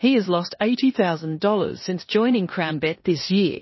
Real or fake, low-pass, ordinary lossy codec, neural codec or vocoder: fake; 7.2 kHz; MP3, 24 kbps; codec, 16 kHz in and 24 kHz out, 0.9 kbps, LongCat-Audio-Codec, four codebook decoder